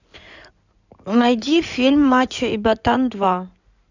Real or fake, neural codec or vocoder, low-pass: fake; codec, 16 kHz in and 24 kHz out, 2.2 kbps, FireRedTTS-2 codec; 7.2 kHz